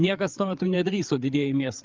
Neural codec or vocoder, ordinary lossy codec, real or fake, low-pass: codec, 16 kHz, 8 kbps, FreqCodec, larger model; Opus, 32 kbps; fake; 7.2 kHz